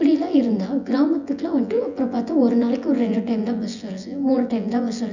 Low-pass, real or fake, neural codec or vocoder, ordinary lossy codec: 7.2 kHz; fake; vocoder, 24 kHz, 100 mel bands, Vocos; none